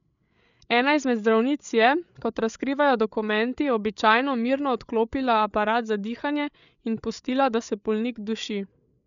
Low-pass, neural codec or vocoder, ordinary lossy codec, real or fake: 7.2 kHz; codec, 16 kHz, 8 kbps, FreqCodec, larger model; none; fake